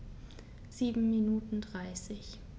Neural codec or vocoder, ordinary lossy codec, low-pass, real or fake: none; none; none; real